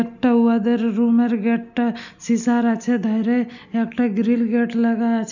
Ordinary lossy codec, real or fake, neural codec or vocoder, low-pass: none; real; none; 7.2 kHz